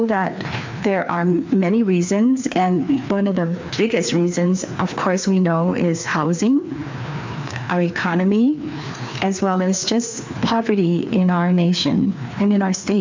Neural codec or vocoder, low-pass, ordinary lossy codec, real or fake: codec, 16 kHz, 2 kbps, FreqCodec, larger model; 7.2 kHz; MP3, 64 kbps; fake